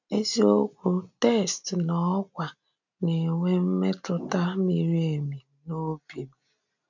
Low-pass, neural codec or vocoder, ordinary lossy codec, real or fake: 7.2 kHz; none; none; real